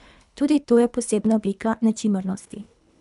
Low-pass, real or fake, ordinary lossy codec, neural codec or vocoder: 10.8 kHz; fake; none; codec, 24 kHz, 3 kbps, HILCodec